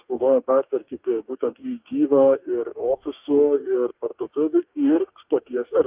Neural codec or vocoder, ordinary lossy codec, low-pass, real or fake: codec, 44.1 kHz, 2.6 kbps, DAC; Opus, 24 kbps; 3.6 kHz; fake